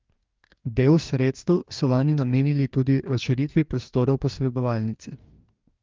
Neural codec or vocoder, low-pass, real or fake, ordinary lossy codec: codec, 44.1 kHz, 2.6 kbps, DAC; 7.2 kHz; fake; Opus, 24 kbps